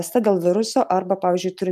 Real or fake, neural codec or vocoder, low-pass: real; none; 14.4 kHz